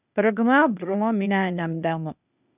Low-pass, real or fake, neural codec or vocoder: 3.6 kHz; fake; codec, 16 kHz, 0.8 kbps, ZipCodec